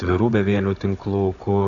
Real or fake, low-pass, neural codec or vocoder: fake; 7.2 kHz; codec, 16 kHz, 16 kbps, FunCodec, trained on Chinese and English, 50 frames a second